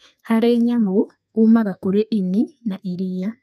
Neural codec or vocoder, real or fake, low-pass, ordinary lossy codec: codec, 32 kHz, 1.9 kbps, SNAC; fake; 14.4 kHz; none